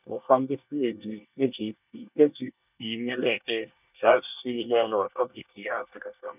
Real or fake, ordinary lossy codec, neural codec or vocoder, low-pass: fake; none; codec, 24 kHz, 1 kbps, SNAC; 3.6 kHz